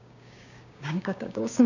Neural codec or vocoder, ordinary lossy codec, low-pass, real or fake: codec, 16 kHz, 6 kbps, DAC; none; 7.2 kHz; fake